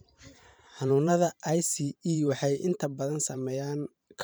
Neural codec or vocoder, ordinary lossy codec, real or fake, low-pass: vocoder, 44.1 kHz, 128 mel bands every 512 samples, BigVGAN v2; none; fake; none